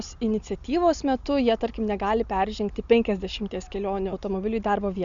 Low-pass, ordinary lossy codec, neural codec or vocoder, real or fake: 7.2 kHz; Opus, 64 kbps; none; real